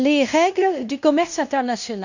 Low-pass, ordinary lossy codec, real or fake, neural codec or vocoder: 7.2 kHz; none; fake; codec, 16 kHz in and 24 kHz out, 0.9 kbps, LongCat-Audio-Codec, fine tuned four codebook decoder